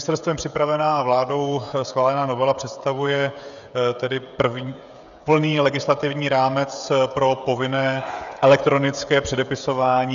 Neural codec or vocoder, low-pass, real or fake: codec, 16 kHz, 16 kbps, FreqCodec, smaller model; 7.2 kHz; fake